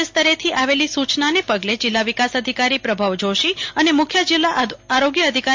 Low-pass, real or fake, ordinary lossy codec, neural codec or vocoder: 7.2 kHz; real; MP3, 48 kbps; none